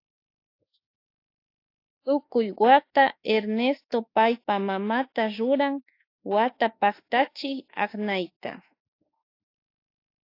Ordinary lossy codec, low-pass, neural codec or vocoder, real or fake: AAC, 32 kbps; 5.4 kHz; autoencoder, 48 kHz, 32 numbers a frame, DAC-VAE, trained on Japanese speech; fake